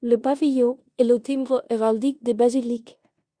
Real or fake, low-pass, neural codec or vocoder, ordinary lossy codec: fake; 9.9 kHz; codec, 16 kHz in and 24 kHz out, 0.9 kbps, LongCat-Audio-Codec, four codebook decoder; Opus, 64 kbps